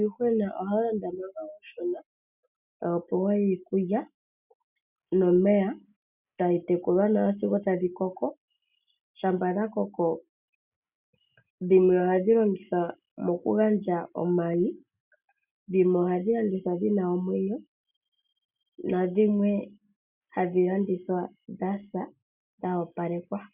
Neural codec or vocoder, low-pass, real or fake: none; 3.6 kHz; real